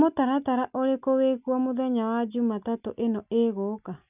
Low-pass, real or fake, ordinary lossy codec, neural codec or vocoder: 3.6 kHz; real; none; none